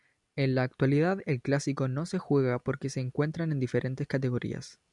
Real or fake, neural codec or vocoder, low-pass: real; none; 10.8 kHz